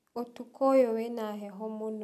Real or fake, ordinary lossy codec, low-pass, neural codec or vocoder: real; none; 14.4 kHz; none